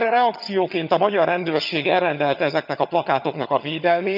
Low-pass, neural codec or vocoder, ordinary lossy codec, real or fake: 5.4 kHz; vocoder, 22.05 kHz, 80 mel bands, HiFi-GAN; none; fake